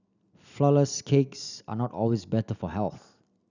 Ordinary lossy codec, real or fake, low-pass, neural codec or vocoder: none; real; 7.2 kHz; none